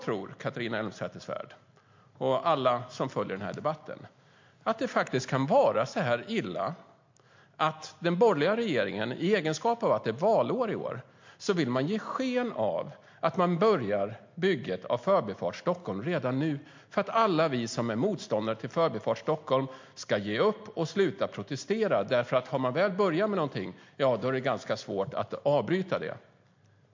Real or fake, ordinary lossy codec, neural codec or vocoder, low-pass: real; MP3, 48 kbps; none; 7.2 kHz